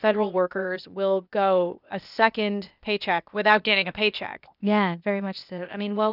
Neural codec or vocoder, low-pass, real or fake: codec, 16 kHz, 0.8 kbps, ZipCodec; 5.4 kHz; fake